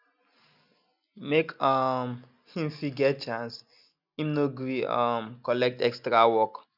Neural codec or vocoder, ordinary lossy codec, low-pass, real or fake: none; none; 5.4 kHz; real